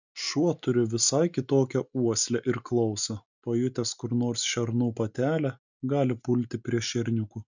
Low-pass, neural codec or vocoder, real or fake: 7.2 kHz; none; real